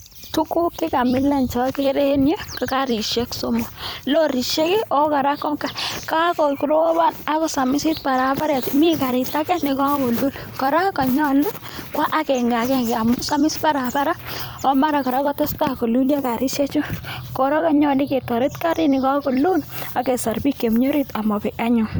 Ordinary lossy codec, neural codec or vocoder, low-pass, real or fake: none; vocoder, 44.1 kHz, 128 mel bands every 256 samples, BigVGAN v2; none; fake